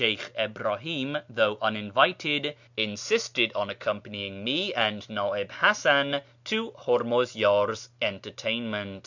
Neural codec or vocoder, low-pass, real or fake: none; 7.2 kHz; real